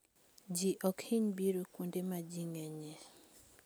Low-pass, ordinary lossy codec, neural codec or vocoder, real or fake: none; none; none; real